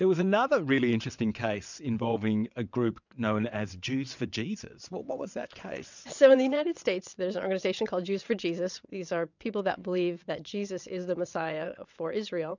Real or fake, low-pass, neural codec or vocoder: fake; 7.2 kHz; vocoder, 22.05 kHz, 80 mel bands, WaveNeXt